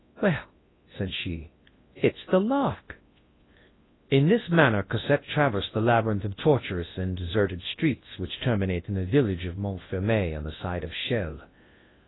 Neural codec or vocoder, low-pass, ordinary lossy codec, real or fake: codec, 24 kHz, 0.9 kbps, WavTokenizer, large speech release; 7.2 kHz; AAC, 16 kbps; fake